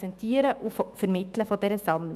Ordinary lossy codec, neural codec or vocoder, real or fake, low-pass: none; autoencoder, 48 kHz, 128 numbers a frame, DAC-VAE, trained on Japanese speech; fake; 14.4 kHz